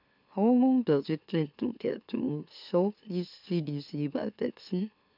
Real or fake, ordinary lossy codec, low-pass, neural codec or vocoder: fake; none; 5.4 kHz; autoencoder, 44.1 kHz, a latent of 192 numbers a frame, MeloTTS